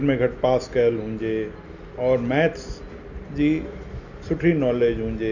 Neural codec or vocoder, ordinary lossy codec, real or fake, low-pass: none; none; real; 7.2 kHz